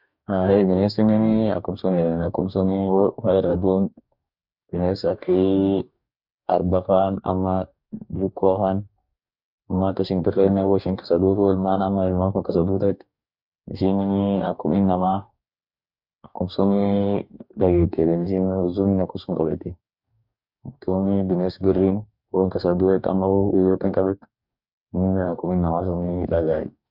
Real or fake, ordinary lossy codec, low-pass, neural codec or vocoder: fake; none; 5.4 kHz; codec, 44.1 kHz, 2.6 kbps, DAC